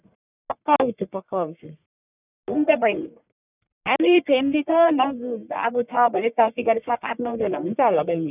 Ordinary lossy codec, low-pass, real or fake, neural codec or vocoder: none; 3.6 kHz; fake; codec, 44.1 kHz, 1.7 kbps, Pupu-Codec